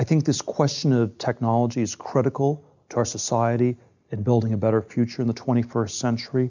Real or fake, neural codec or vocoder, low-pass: real; none; 7.2 kHz